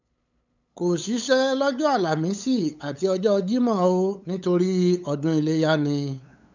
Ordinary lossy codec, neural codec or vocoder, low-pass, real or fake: none; codec, 16 kHz, 8 kbps, FunCodec, trained on LibriTTS, 25 frames a second; 7.2 kHz; fake